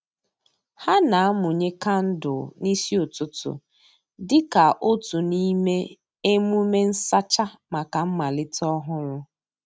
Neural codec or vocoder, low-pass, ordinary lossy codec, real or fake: none; none; none; real